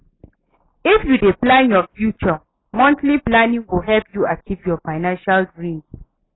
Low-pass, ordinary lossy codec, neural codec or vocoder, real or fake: 7.2 kHz; AAC, 16 kbps; none; real